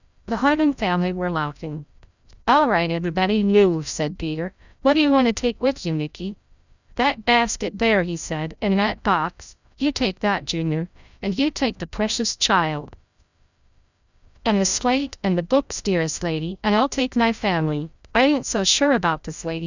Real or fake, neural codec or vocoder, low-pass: fake; codec, 16 kHz, 0.5 kbps, FreqCodec, larger model; 7.2 kHz